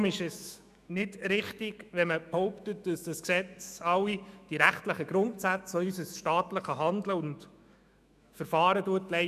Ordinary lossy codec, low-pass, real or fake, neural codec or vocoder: none; 14.4 kHz; fake; autoencoder, 48 kHz, 128 numbers a frame, DAC-VAE, trained on Japanese speech